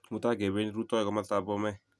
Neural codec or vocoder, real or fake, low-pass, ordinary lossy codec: none; real; none; none